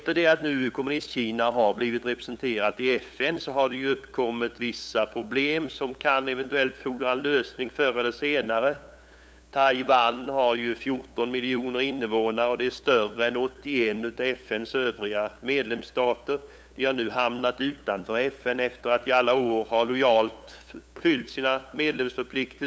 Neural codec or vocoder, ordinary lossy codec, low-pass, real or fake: codec, 16 kHz, 8 kbps, FunCodec, trained on LibriTTS, 25 frames a second; none; none; fake